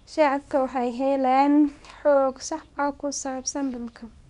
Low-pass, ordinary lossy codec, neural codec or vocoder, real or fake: 10.8 kHz; none; codec, 24 kHz, 0.9 kbps, WavTokenizer, small release; fake